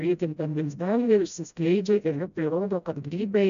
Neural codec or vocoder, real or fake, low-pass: codec, 16 kHz, 0.5 kbps, FreqCodec, smaller model; fake; 7.2 kHz